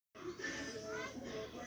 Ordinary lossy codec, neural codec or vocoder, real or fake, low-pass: none; codec, 44.1 kHz, 7.8 kbps, Pupu-Codec; fake; none